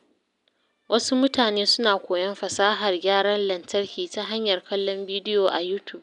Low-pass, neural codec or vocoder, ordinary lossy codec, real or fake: 10.8 kHz; none; none; real